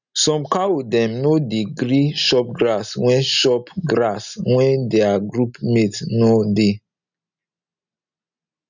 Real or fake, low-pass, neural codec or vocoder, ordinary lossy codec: real; 7.2 kHz; none; none